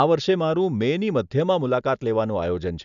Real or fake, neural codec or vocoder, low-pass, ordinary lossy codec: real; none; 7.2 kHz; none